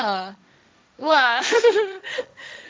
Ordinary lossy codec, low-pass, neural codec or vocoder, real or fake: none; none; codec, 16 kHz, 1.1 kbps, Voila-Tokenizer; fake